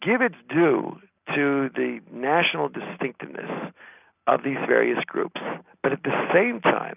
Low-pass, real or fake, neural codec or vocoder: 3.6 kHz; real; none